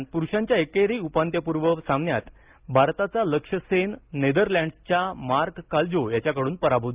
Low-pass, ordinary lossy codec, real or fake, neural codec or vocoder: 3.6 kHz; Opus, 24 kbps; real; none